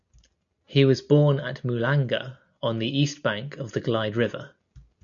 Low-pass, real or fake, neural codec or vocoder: 7.2 kHz; real; none